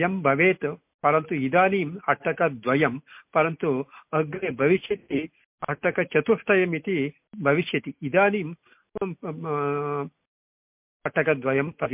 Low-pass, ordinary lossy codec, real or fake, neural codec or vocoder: 3.6 kHz; MP3, 32 kbps; real; none